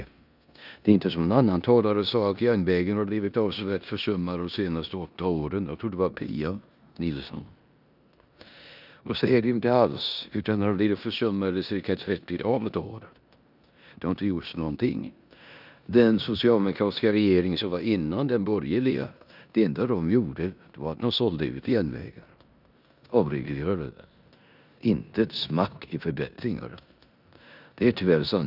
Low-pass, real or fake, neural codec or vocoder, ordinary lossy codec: 5.4 kHz; fake; codec, 16 kHz in and 24 kHz out, 0.9 kbps, LongCat-Audio-Codec, four codebook decoder; none